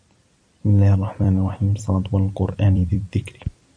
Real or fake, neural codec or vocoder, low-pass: fake; vocoder, 44.1 kHz, 128 mel bands every 256 samples, BigVGAN v2; 9.9 kHz